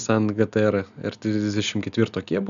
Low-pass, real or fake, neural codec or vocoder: 7.2 kHz; real; none